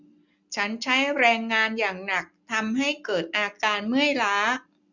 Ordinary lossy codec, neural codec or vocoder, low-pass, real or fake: none; none; 7.2 kHz; real